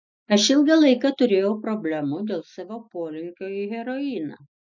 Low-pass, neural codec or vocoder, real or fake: 7.2 kHz; none; real